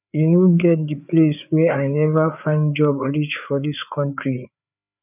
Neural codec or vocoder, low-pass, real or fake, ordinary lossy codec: codec, 16 kHz, 4 kbps, FreqCodec, larger model; 3.6 kHz; fake; none